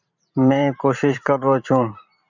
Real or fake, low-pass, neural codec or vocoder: fake; 7.2 kHz; vocoder, 44.1 kHz, 128 mel bands every 512 samples, BigVGAN v2